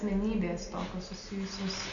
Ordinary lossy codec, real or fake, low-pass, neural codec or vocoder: AAC, 48 kbps; real; 7.2 kHz; none